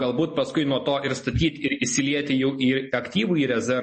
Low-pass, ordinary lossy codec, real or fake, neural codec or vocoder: 10.8 kHz; MP3, 32 kbps; real; none